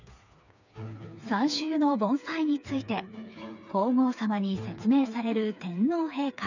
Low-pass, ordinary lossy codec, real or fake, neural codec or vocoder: 7.2 kHz; none; fake; codec, 16 kHz, 4 kbps, FreqCodec, smaller model